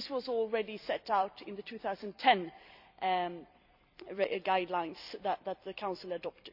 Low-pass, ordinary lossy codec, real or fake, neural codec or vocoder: 5.4 kHz; none; fake; vocoder, 44.1 kHz, 128 mel bands every 256 samples, BigVGAN v2